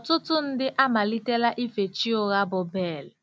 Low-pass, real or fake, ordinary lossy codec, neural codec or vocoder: none; real; none; none